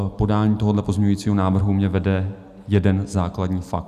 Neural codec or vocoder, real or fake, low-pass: none; real; 14.4 kHz